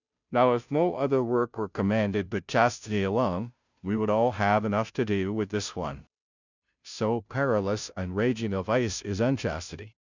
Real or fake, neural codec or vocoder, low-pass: fake; codec, 16 kHz, 0.5 kbps, FunCodec, trained on Chinese and English, 25 frames a second; 7.2 kHz